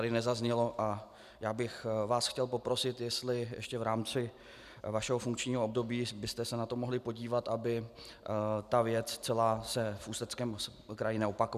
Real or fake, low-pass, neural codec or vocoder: real; 14.4 kHz; none